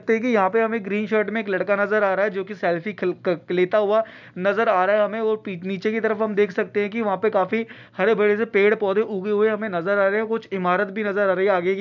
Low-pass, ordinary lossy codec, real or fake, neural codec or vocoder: 7.2 kHz; none; real; none